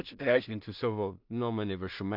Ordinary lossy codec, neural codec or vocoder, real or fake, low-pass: MP3, 48 kbps; codec, 16 kHz in and 24 kHz out, 0.4 kbps, LongCat-Audio-Codec, two codebook decoder; fake; 5.4 kHz